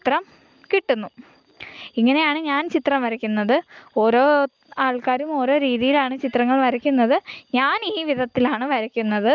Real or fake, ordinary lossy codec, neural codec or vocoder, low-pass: real; Opus, 24 kbps; none; 7.2 kHz